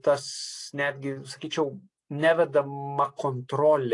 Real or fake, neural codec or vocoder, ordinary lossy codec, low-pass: real; none; AAC, 48 kbps; 10.8 kHz